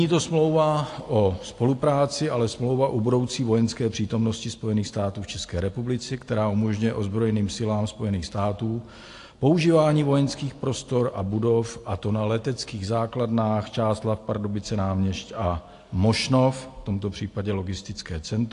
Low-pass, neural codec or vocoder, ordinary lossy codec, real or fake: 10.8 kHz; none; AAC, 48 kbps; real